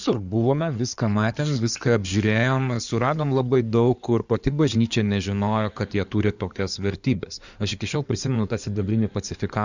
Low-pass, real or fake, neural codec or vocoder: 7.2 kHz; fake; codec, 16 kHz in and 24 kHz out, 2.2 kbps, FireRedTTS-2 codec